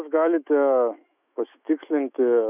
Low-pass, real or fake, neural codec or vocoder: 3.6 kHz; fake; autoencoder, 48 kHz, 128 numbers a frame, DAC-VAE, trained on Japanese speech